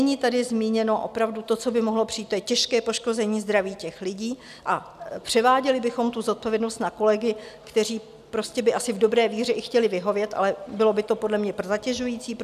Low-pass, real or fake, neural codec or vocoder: 14.4 kHz; real; none